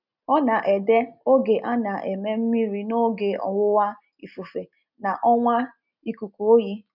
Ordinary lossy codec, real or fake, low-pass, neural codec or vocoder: none; real; 5.4 kHz; none